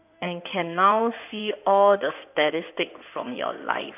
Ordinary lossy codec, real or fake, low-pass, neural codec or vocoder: none; fake; 3.6 kHz; codec, 16 kHz in and 24 kHz out, 2.2 kbps, FireRedTTS-2 codec